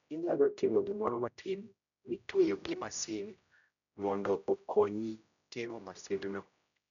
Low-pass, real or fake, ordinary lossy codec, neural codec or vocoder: 7.2 kHz; fake; none; codec, 16 kHz, 0.5 kbps, X-Codec, HuBERT features, trained on general audio